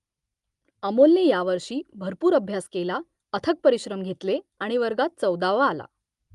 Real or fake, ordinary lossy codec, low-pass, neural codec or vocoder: real; Opus, 32 kbps; 10.8 kHz; none